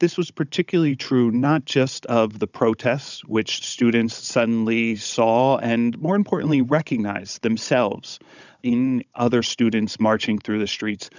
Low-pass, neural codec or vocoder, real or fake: 7.2 kHz; codec, 16 kHz, 16 kbps, FunCodec, trained on Chinese and English, 50 frames a second; fake